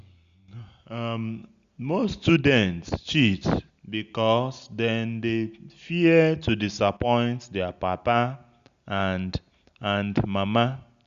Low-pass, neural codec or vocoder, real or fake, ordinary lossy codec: 7.2 kHz; none; real; Opus, 64 kbps